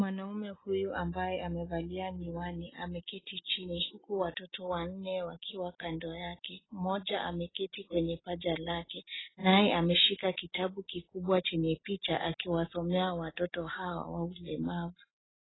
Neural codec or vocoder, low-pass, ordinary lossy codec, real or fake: none; 7.2 kHz; AAC, 16 kbps; real